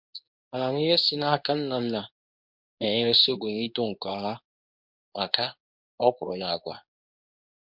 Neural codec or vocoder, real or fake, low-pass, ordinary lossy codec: codec, 24 kHz, 0.9 kbps, WavTokenizer, medium speech release version 2; fake; 5.4 kHz; MP3, 48 kbps